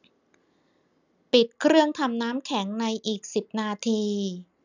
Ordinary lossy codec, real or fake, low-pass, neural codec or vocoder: none; real; 7.2 kHz; none